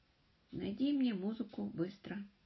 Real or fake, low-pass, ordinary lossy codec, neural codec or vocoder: real; 7.2 kHz; MP3, 24 kbps; none